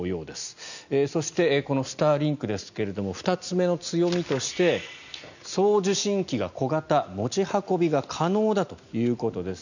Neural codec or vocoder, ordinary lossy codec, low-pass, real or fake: none; none; 7.2 kHz; real